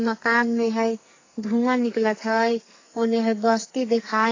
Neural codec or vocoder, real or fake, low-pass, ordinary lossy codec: codec, 44.1 kHz, 2.6 kbps, SNAC; fake; 7.2 kHz; AAC, 32 kbps